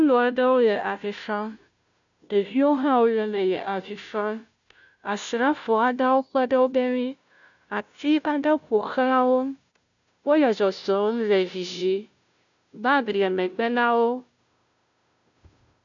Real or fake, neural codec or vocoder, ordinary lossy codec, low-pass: fake; codec, 16 kHz, 0.5 kbps, FunCodec, trained on Chinese and English, 25 frames a second; MP3, 96 kbps; 7.2 kHz